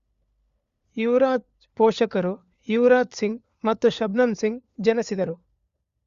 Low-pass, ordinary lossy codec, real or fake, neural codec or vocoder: 7.2 kHz; Opus, 64 kbps; fake; codec, 16 kHz, 4 kbps, FunCodec, trained on LibriTTS, 50 frames a second